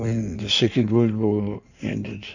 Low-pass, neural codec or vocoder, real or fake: 7.2 kHz; codec, 16 kHz in and 24 kHz out, 1.1 kbps, FireRedTTS-2 codec; fake